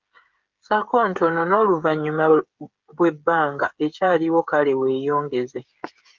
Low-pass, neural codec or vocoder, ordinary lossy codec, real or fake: 7.2 kHz; codec, 16 kHz, 8 kbps, FreqCodec, smaller model; Opus, 32 kbps; fake